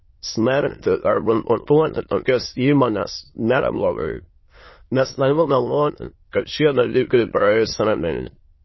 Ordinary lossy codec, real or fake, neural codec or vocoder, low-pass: MP3, 24 kbps; fake; autoencoder, 22.05 kHz, a latent of 192 numbers a frame, VITS, trained on many speakers; 7.2 kHz